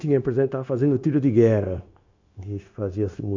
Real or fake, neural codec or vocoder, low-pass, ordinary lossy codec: fake; codec, 16 kHz, 0.9 kbps, LongCat-Audio-Codec; 7.2 kHz; MP3, 64 kbps